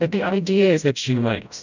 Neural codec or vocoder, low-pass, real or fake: codec, 16 kHz, 0.5 kbps, FreqCodec, smaller model; 7.2 kHz; fake